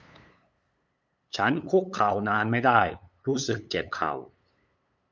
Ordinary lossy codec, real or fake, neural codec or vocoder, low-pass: none; fake; codec, 16 kHz, 8 kbps, FunCodec, trained on LibriTTS, 25 frames a second; none